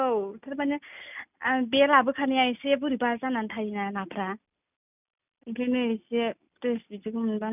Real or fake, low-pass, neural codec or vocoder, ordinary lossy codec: real; 3.6 kHz; none; none